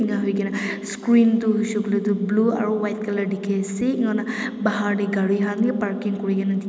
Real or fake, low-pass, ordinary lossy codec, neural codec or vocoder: real; none; none; none